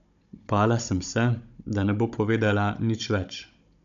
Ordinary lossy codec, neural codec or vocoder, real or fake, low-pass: MP3, 64 kbps; codec, 16 kHz, 16 kbps, FunCodec, trained on Chinese and English, 50 frames a second; fake; 7.2 kHz